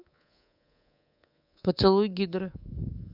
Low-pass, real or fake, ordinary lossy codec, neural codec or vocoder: 5.4 kHz; fake; none; codec, 24 kHz, 3.1 kbps, DualCodec